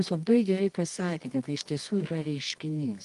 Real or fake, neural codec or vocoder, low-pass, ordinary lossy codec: fake; codec, 24 kHz, 0.9 kbps, WavTokenizer, medium music audio release; 10.8 kHz; Opus, 32 kbps